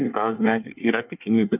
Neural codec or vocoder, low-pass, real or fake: codec, 24 kHz, 1 kbps, SNAC; 3.6 kHz; fake